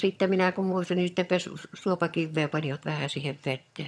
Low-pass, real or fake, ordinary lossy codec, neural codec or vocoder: none; fake; none; vocoder, 22.05 kHz, 80 mel bands, HiFi-GAN